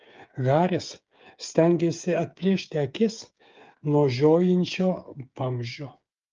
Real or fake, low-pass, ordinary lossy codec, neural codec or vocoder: fake; 7.2 kHz; Opus, 24 kbps; codec, 16 kHz, 8 kbps, FreqCodec, smaller model